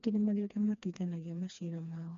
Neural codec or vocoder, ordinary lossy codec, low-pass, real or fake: codec, 16 kHz, 2 kbps, FreqCodec, smaller model; MP3, 48 kbps; 7.2 kHz; fake